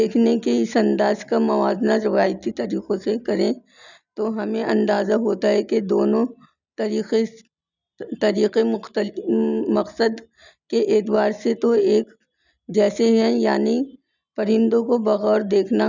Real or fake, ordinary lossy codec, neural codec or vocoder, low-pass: real; none; none; 7.2 kHz